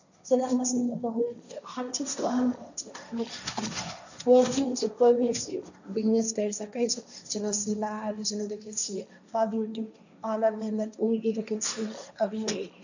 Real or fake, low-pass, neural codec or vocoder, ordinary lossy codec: fake; 7.2 kHz; codec, 16 kHz, 1.1 kbps, Voila-Tokenizer; none